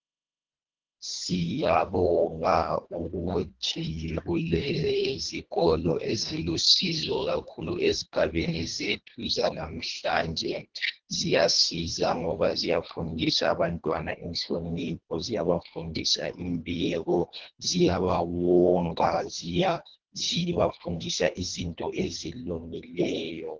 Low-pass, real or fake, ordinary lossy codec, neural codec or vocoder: 7.2 kHz; fake; Opus, 16 kbps; codec, 24 kHz, 1.5 kbps, HILCodec